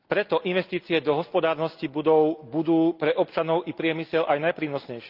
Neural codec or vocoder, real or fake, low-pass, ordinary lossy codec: none; real; 5.4 kHz; Opus, 24 kbps